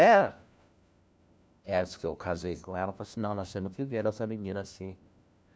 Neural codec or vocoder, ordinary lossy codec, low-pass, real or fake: codec, 16 kHz, 1 kbps, FunCodec, trained on LibriTTS, 50 frames a second; none; none; fake